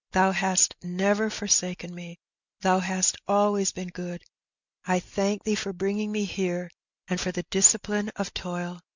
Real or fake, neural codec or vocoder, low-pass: real; none; 7.2 kHz